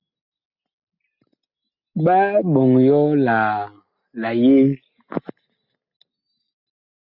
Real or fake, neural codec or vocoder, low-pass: real; none; 5.4 kHz